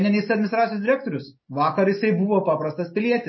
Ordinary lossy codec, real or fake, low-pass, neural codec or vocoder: MP3, 24 kbps; real; 7.2 kHz; none